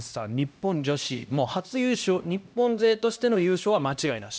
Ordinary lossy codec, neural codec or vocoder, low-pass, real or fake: none; codec, 16 kHz, 1 kbps, X-Codec, HuBERT features, trained on LibriSpeech; none; fake